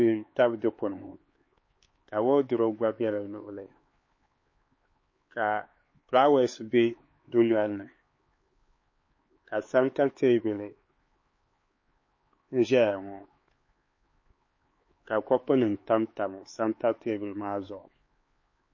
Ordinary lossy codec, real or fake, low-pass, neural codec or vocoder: MP3, 32 kbps; fake; 7.2 kHz; codec, 16 kHz, 4 kbps, X-Codec, HuBERT features, trained on LibriSpeech